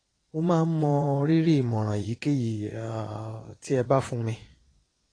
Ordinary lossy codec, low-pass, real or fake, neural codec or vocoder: AAC, 32 kbps; 9.9 kHz; fake; vocoder, 22.05 kHz, 80 mel bands, Vocos